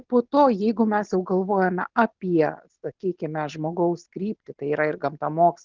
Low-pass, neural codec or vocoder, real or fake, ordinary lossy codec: 7.2 kHz; none; real; Opus, 24 kbps